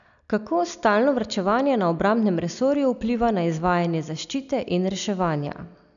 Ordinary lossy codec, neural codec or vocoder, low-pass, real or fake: none; none; 7.2 kHz; real